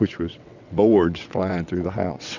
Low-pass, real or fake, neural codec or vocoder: 7.2 kHz; fake; vocoder, 22.05 kHz, 80 mel bands, WaveNeXt